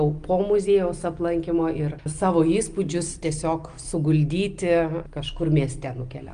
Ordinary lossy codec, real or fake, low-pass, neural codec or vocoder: Opus, 32 kbps; real; 9.9 kHz; none